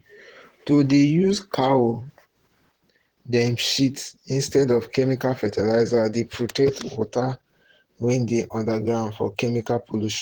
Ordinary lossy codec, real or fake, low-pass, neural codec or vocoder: Opus, 16 kbps; fake; 19.8 kHz; vocoder, 44.1 kHz, 128 mel bands, Pupu-Vocoder